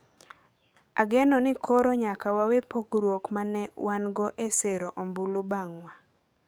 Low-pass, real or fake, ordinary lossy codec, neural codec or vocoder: none; fake; none; codec, 44.1 kHz, 7.8 kbps, DAC